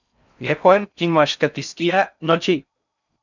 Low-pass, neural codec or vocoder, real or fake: 7.2 kHz; codec, 16 kHz in and 24 kHz out, 0.6 kbps, FocalCodec, streaming, 4096 codes; fake